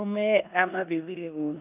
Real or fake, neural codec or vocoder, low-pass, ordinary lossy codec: fake; codec, 16 kHz in and 24 kHz out, 0.9 kbps, LongCat-Audio-Codec, four codebook decoder; 3.6 kHz; none